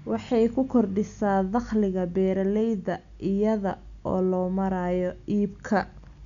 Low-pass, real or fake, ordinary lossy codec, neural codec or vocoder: 7.2 kHz; real; none; none